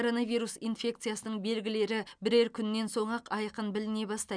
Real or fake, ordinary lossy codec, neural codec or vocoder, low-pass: real; none; none; 9.9 kHz